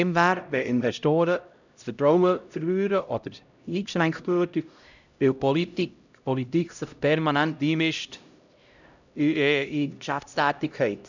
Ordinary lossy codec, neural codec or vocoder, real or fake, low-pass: none; codec, 16 kHz, 0.5 kbps, X-Codec, HuBERT features, trained on LibriSpeech; fake; 7.2 kHz